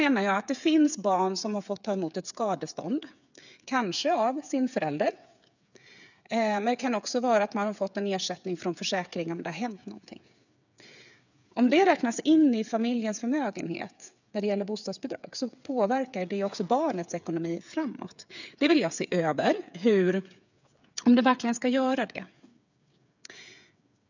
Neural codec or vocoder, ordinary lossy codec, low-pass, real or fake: codec, 16 kHz, 8 kbps, FreqCodec, smaller model; none; 7.2 kHz; fake